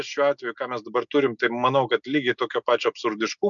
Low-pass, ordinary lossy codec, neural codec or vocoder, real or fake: 7.2 kHz; MP3, 64 kbps; none; real